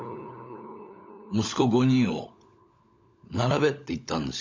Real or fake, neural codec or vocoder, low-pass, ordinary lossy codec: fake; codec, 16 kHz, 16 kbps, FunCodec, trained on LibriTTS, 50 frames a second; 7.2 kHz; MP3, 48 kbps